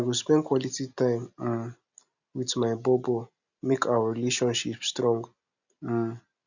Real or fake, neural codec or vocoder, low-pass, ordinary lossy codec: real; none; 7.2 kHz; none